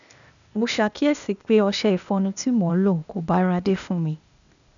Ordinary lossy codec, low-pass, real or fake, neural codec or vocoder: none; 7.2 kHz; fake; codec, 16 kHz, 0.8 kbps, ZipCodec